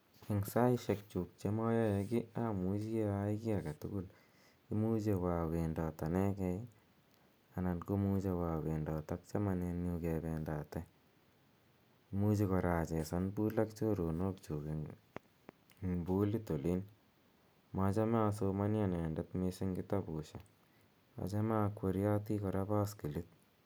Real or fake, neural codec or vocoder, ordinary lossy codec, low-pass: real; none; none; none